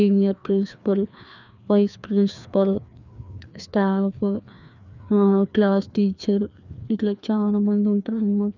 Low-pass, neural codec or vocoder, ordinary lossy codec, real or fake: 7.2 kHz; codec, 16 kHz, 2 kbps, FreqCodec, larger model; none; fake